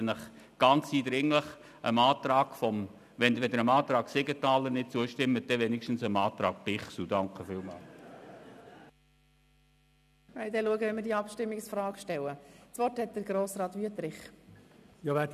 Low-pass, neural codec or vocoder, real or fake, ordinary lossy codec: 14.4 kHz; none; real; none